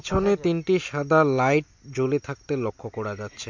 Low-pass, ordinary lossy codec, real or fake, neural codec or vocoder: 7.2 kHz; MP3, 48 kbps; real; none